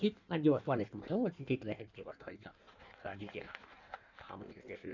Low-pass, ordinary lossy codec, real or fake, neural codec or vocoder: 7.2 kHz; none; fake; codec, 16 kHz in and 24 kHz out, 1.1 kbps, FireRedTTS-2 codec